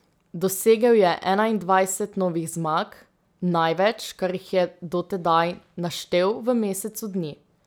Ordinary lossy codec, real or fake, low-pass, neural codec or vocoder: none; real; none; none